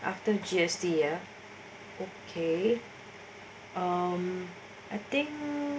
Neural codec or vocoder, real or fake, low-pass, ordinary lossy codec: none; real; none; none